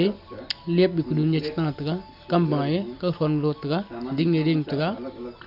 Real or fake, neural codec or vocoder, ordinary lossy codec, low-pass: real; none; none; 5.4 kHz